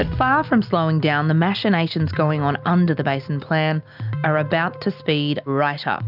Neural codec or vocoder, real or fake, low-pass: none; real; 5.4 kHz